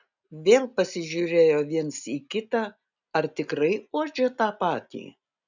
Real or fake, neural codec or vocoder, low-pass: real; none; 7.2 kHz